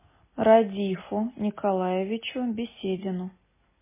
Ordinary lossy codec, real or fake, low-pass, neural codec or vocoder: MP3, 16 kbps; real; 3.6 kHz; none